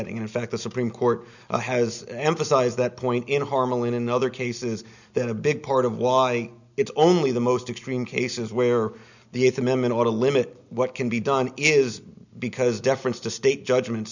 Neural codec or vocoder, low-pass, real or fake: none; 7.2 kHz; real